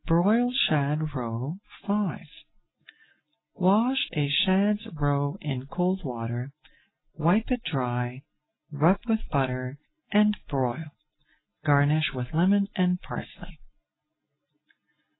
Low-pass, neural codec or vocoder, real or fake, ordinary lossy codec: 7.2 kHz; none; real; AAC, 16 kbps